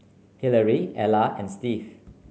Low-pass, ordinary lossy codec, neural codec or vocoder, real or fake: none; none; none; real